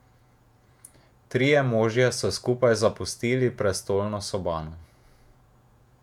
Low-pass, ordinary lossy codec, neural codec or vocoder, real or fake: 19.8 kHz; none; vocoder, 48 kHz, 128 mel bands, Vocos; fake